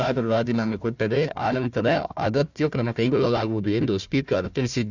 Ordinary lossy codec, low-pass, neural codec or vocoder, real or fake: none; 7.2 kHz; codec, 16 kHz, 1 kbps, FunCodec, trained on Chinese and English, 50 frames a second; fake